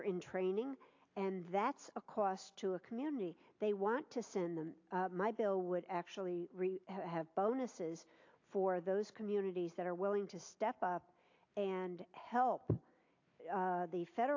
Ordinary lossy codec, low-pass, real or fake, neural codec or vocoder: AAC, 48 kbps; 7.2 kHz; real; none